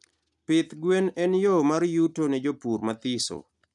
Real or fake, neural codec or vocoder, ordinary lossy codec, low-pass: real; none; none; 10.8 kHz